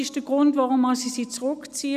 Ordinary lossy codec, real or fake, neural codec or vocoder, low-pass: none; real; none; 14.4 kHz